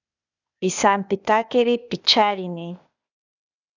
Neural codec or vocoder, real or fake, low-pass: codec, 16 kHz, 0.8 kbps, ZipCodec; fake; 7.2 kHz